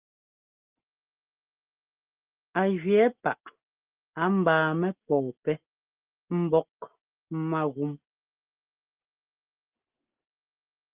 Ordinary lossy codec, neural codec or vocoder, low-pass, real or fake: Opus, 24 kbps; none; 3.6 kHz; real